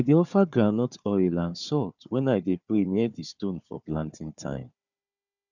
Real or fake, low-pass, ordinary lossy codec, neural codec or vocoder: fake; 7.2 kHz; none; codec, 16 kHz, 4 kbps, FunCodec, trained on Chinese and English, 50 frames a second